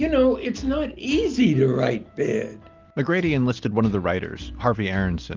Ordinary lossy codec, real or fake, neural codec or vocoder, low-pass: Opus, 24 kbps; real; none; 7.2 kHz